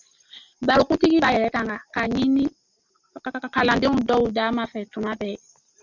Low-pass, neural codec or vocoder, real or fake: 7.2 kHz; none; real